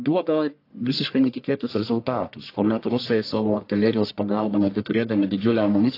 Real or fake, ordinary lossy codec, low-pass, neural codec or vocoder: fake; AAC, 32 kbps; 5.4 kHz; codec, 44.1 kHz, 1.7 kbps, Pupu-Codec